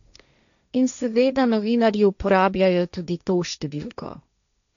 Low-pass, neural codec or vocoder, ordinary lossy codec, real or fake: 7.2 kHz; codec, 16 kHz, 1.1 kbps, Voila-Tokenizer; none; fake